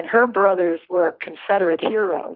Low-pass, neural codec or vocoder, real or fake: 5.4 kHz; codec, 24 kHz, 3 kbps, HILCodec; fake